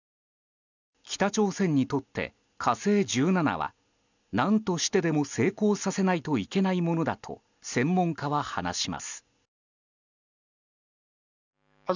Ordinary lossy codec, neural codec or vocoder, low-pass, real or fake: none; none; 7.2 kHz; real